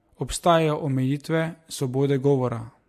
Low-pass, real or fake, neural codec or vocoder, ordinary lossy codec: 14.4 kHz; real; none; MP3, 64 kbps